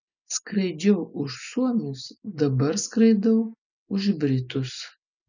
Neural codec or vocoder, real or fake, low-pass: none; real; 7.2 kHz